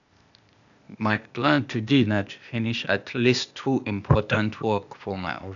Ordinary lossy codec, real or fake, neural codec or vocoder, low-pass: none; fake; codec, 16 kHz, 0.8 kbps, ZipCodec; 7.2 kHz